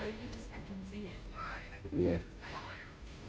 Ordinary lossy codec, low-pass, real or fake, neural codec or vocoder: none; none; fake; codec, 16 kHz, 0.5 kbps, FunCodec, trained on Chinese and English, 25 frames a second